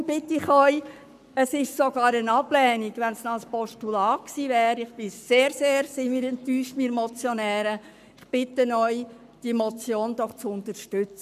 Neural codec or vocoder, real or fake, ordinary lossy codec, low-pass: codec, 44.1 kHz, 7.8 kbps, Pupu-Codec; fake; none; 14.4 kHz